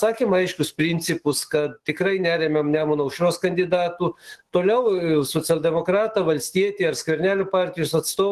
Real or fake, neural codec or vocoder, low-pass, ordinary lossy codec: fake; autoencoder, 48 kHz, 128 numbers a frame, DAC-VAE, trained on Japanese speech; 14.4 kHz; Opus, 16 kbps